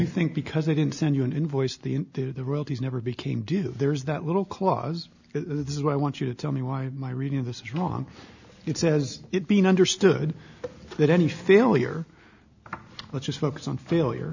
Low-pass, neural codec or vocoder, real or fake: 7.2 kHz; none; real